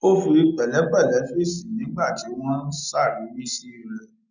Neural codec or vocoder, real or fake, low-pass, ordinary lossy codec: none; real; 7.2 kHz; none